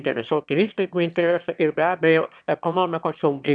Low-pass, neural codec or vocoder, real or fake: 9.9 kHz; autoencoder, 22.05 kHz, a latent of 192 numbers a frame, VITS, trained on one speaker; fake